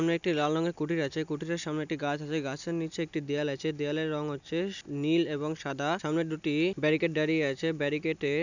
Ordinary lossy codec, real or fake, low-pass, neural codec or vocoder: none; real; 7.2 kHz; none